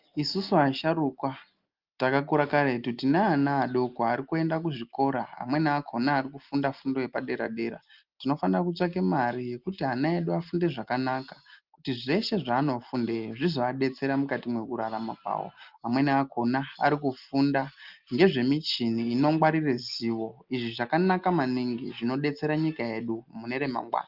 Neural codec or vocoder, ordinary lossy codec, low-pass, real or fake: none; Opus, 32 kbps; 5.4 kHz; real